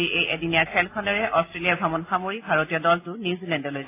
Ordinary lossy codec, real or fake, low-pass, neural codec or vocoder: AAC, 24 kbps; real; 3.6 kHz; none